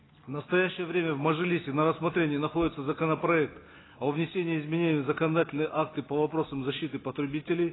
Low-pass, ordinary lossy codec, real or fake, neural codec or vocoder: 7.2 kHz; AAC, 16 kbps; real; none